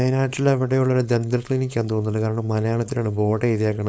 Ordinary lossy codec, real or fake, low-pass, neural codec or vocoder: none; fake; none; codec, 16 kHz, 4.8 kbps, FACodec